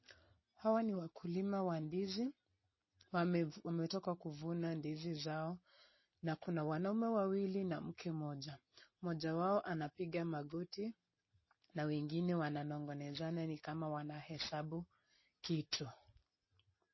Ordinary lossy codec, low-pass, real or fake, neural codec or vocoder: MP3, 24 kbps; 7.2 kHz; real; none